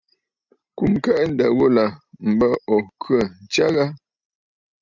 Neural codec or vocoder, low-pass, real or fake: none; 7.2 kHz; real